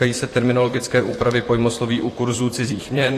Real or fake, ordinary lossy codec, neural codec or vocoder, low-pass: fake; AAC, 48 kbps; vocoder, 44.1 kHz, 128 mel bands, Pupu-Vocoder; 14.4 kHz